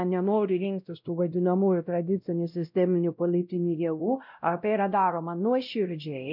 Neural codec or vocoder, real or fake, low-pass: codec, 16 kHz, 0.5 kbps, X-Codec, WavLM features, trained on Multilingual LibriSpeech; fake; 5.4 kHz